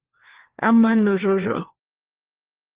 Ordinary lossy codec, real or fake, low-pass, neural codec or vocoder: Opus, 16 kbps; fake; 3.6 kHz; codec, 16 kHz, 1 kbps, FunCodec, trained on LibriTTS, 50 frames a second